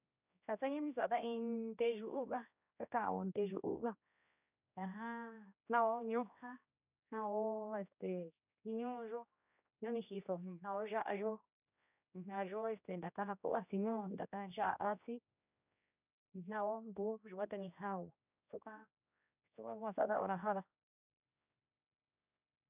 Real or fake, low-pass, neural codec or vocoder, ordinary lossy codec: fake; 3.6 kHz; codec, 16 kHz, 1 kbps, X-Codec, HuBERT features, trained on general audio; none